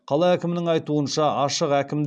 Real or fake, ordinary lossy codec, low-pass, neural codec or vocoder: real; none; none; none